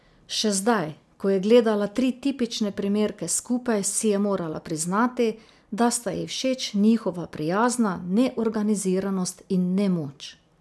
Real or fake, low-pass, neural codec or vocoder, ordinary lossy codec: real; none; none; none